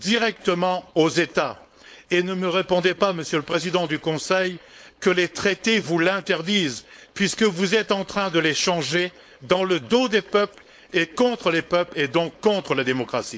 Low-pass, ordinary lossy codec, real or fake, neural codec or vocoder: none; none; fake; codec, 16 kHz, 4.8 kbps, FACodec